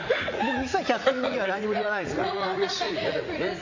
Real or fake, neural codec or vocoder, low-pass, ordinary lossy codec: fake; vocoder, 44.1 kHz, 80 mel bands, Vocos; 7.2 kHz; MP3, 48 kbps